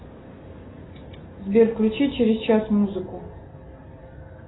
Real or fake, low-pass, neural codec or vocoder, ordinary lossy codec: real; 7.2 kHz; none; AAC, 16 kbps